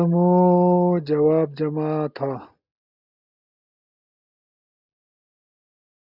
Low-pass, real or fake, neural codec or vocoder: 5.4 kHz; real; none